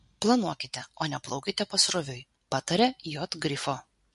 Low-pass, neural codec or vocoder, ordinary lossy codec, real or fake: 14.4 kHz; none; MP3, 48 kbps; real